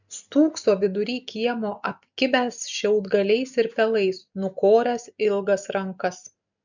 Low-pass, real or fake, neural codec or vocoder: 7.2 kHz; real; none